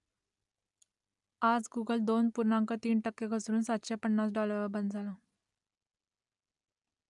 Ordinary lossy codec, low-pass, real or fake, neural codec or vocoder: none; 10.8 kHz; real; none